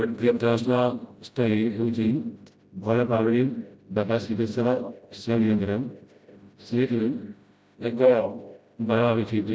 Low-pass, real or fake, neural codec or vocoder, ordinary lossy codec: none; fake; codec, 16 kHz, 0.5 kbps, FreqCodec, smaller model; none